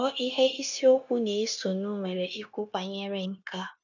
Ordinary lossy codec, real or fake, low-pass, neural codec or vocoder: none; fake; 7.2 kHz; codec, 16 kHz, 0.9 kbps, LongCat-Audio-Codec